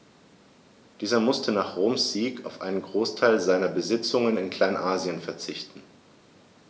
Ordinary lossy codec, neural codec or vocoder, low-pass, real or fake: none; none; none; real